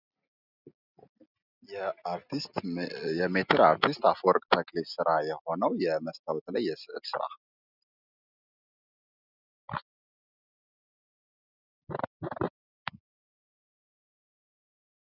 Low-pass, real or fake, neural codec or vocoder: 5.4 kHz; real; none